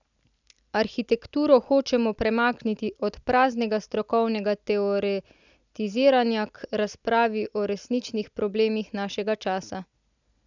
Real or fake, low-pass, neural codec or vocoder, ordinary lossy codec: real; 7.2 kHz; none; none